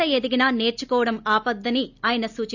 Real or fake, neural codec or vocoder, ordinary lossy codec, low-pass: real; none; none; 7.2 kHz